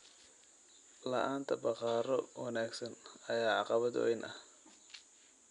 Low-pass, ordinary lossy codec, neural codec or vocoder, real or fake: 10.8 kHz; none; none; real